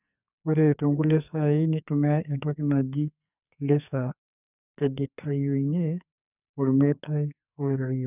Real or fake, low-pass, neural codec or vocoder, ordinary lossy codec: fake; 3.6 kHz; codec, 44.1 kHz, 2.6 kbps, SNAC; none